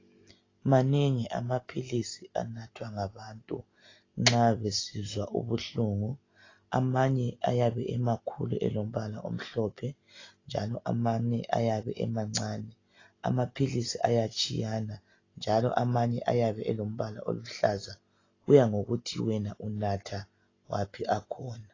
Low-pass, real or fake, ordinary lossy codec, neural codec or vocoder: 7.2 kHz; real; AAC, 32 kbps; none